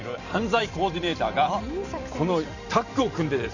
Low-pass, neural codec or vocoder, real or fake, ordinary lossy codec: 7.2 kHz; vocoder, 44.1 kHz, 128 mel bands every 256 samples, BigVGAN v2; fake; none